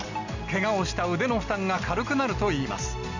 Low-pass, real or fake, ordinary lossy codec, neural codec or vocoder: 7.2 kHz; real; none; none